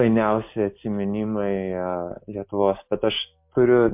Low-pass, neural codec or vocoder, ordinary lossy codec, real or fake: 3.6 kHz; none; MP3, 32 kbps; real